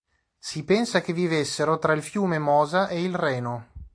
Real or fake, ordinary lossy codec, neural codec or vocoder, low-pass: real; AAC, 48 kbps; none; 9.9 kHz